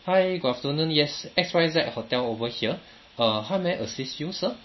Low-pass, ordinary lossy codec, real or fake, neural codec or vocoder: 7.2 kHz; MP3, 24 kbps; real; none